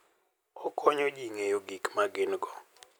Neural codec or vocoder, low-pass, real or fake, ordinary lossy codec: none; none; real; none